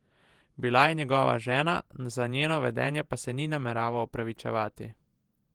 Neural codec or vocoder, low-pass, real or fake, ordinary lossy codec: vocoder, 48 kHz, 128 mel bands, Vocos; 19.8 kHz; fake; Opus, 24 kbps